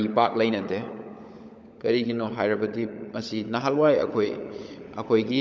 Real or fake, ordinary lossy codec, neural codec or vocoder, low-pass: fake; none; codec, 16 kHz, 16 kbps, FunCodec, trained on LibriTTS, 50 frames a second; none